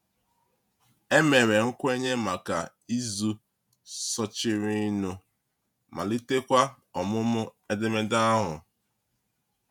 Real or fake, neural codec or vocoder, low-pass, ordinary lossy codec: fake; vocoder, 48 kHz, 128 mel bands, Vocos; 19.8 kHz; none